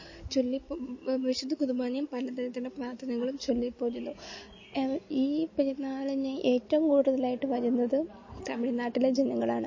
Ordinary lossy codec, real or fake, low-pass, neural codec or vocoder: MP3, 32 kbps; real; 7.2 kHz; none